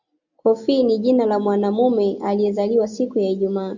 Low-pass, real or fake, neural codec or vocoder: 7.2 kHz; real; none